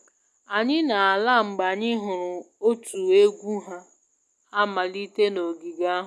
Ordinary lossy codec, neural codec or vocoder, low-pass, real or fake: none; none; none; real